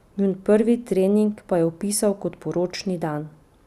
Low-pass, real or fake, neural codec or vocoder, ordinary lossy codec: 14.4 kHz; real; none; none